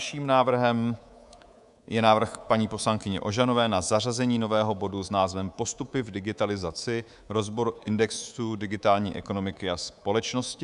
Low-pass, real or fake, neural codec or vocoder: 10.8 kHz; fake; codec, 24 kHz, 3.1 kbps, DualCodec